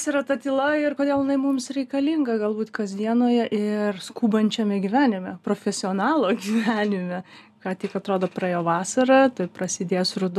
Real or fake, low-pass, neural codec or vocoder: real; 14.4 kHz; none